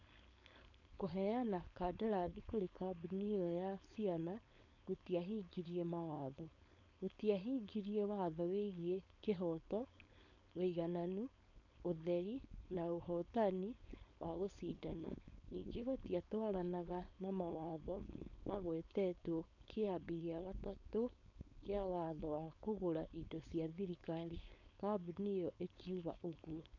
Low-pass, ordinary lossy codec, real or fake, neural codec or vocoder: 7.2 kHz; none; fake; codec, 16 kHz, 4.8 kbps, FACodec